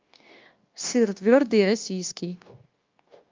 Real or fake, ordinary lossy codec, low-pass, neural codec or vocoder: fake; Opus, 24 kbps; 7.2 kHz; codec, 16 kHz, 0.9 kbps, LongCat-Audio-Codec